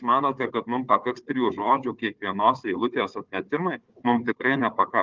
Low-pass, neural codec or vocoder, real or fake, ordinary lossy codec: 7.2 kHz; codec, 16 kHz, 4 kbps, FunCodec, trained on Chinese and English, 50 frames a second; fake; Opus, 32 kbps